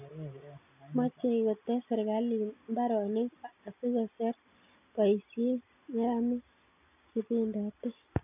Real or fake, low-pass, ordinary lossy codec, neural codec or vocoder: real; 3.6 kHz; none; none